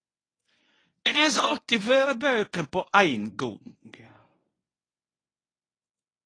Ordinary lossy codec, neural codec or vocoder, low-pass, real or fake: AAC, 32 kbps; codec, 24 kHz, 0.9 kbps, WavTokenizer, medium speech release version 1; 9.9 kHz; fake